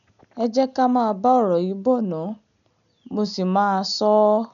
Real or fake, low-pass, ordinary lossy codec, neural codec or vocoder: real; 7.2 kHz; none; none